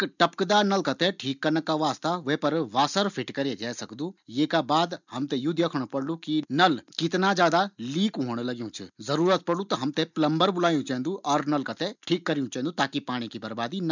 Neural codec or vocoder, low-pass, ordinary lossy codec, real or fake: none; 7.2 kHz; none; real